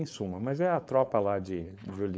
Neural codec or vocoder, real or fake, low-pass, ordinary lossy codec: codec, 16 kHz, 4.8 kbps, FACodec; fake; none; none